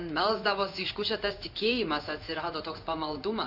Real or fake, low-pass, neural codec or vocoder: fake; 5.4 kHz; codec, 16 kHz in and 24 kHz out, 1 kbps, XY-Tokenizer